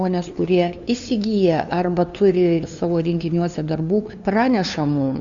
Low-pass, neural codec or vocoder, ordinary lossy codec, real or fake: 7.2 kHz; codec, 16 kHz, 2 kbps, FunCodec, trained on LibriTTS, 25 frames a second; Opus, 64 kbps; fake